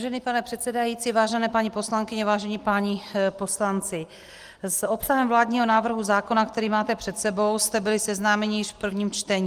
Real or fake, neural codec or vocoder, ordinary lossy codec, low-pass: real; none; Opus, 24 kbps; 14.4 kHz